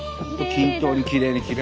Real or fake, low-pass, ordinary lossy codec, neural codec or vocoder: real; none; none; none